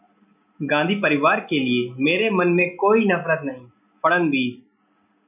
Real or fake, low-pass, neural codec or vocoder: real; 3.6 kHz; none